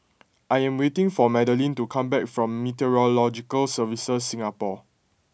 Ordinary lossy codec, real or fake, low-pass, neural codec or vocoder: none; real; none; none